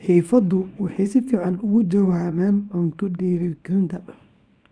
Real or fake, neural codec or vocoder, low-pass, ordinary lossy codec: fake; codec, 24 kHz, 0.9 kbps, WavTokenizer, small release; 9.9 kHz; none